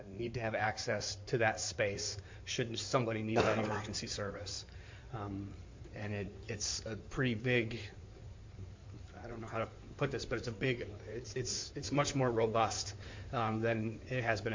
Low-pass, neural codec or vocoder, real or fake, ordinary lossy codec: 7.2 kHz; codec, 16 kHz in and 24 kHz out, 2.2 kbps, FireRedTTS-2 codec; fake; MP3, 48 kbps